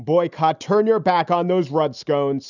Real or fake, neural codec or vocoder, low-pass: real; none; 7.2 kHz